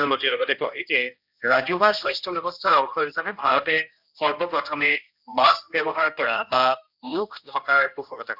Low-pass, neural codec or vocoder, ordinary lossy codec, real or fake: 5.4 kHz; codec, 16 kHz, 1 kbps, X-Codec, HuBERT features, trained on general audio; none; fake